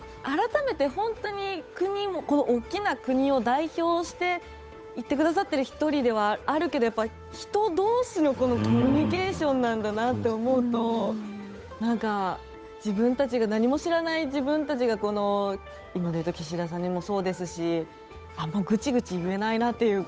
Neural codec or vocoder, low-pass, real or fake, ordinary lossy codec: codec, 16 kHz, 8 kbps, FunCodec, trained on Chinese and English, 25 frames a second; none; fake; none